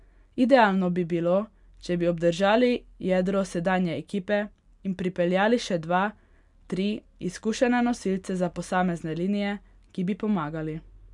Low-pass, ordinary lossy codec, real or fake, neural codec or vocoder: 10.8 kHz; none; real; none